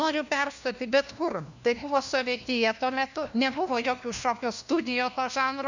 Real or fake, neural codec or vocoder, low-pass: fake; codec, 16 kHz, 1 kbps, FunCodec, trained on LibriTTS, 50 frames a second; 7.2 kHz